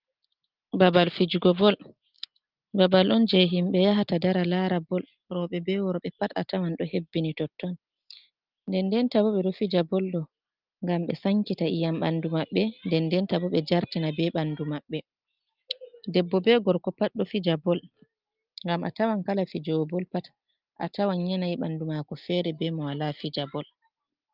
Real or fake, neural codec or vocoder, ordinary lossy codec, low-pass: real; none; Opus, 32 kbps; 5.4 kHz